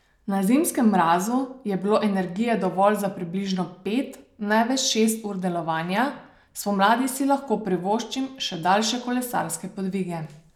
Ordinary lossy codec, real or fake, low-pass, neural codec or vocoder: none; real; 19.8 kHz; none